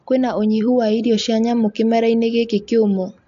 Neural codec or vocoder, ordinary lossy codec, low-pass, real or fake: none; AAC, 64 kbps; 7.2 kHz; real